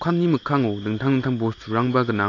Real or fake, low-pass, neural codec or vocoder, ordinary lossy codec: real; 7.2 kHz; none; AAC, 32 kbps